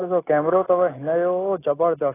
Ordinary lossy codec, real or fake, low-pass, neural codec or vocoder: AAC, 16 kbps; real; 3.6 kHz; none